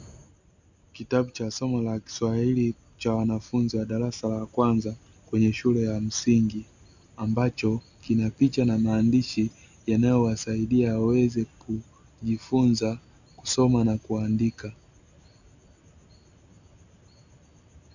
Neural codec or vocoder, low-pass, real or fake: none; 7.2 kHz; real